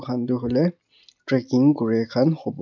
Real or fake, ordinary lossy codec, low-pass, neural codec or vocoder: real; none; 7.2 kHz; none